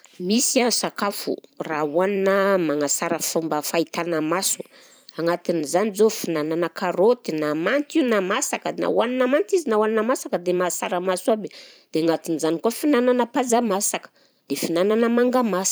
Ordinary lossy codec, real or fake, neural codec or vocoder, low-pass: none; fake; vocoder, 44.1 kHz, 128 mel bands every 512 samples, BigVGAN v2; none